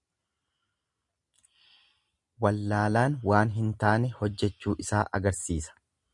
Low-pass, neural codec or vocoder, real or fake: 10.8 kHz; none; real